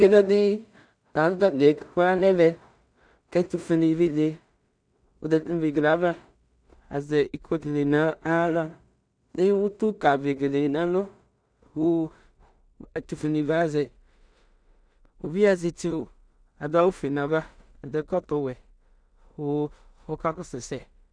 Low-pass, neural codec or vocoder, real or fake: 9.9 kHz; codec, 16 kHz in and 24 kHz out, 0.4 kbps, LongCat-Audio-Codec, two codebook decoder; fake